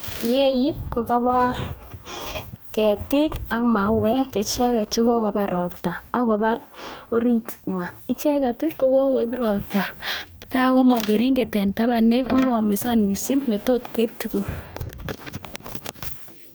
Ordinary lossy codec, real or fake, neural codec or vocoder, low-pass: none; fake; codec, 44.1 kHz, 2.6 kbps, DAC; none